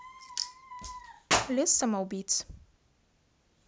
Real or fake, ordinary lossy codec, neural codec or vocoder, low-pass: real; none; none; none